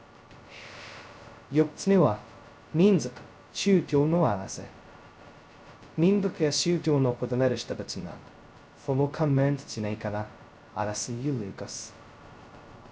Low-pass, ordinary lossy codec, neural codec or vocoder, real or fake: none; none; codec, 16 kHz, 0.2 kbps, FocalCodec; fake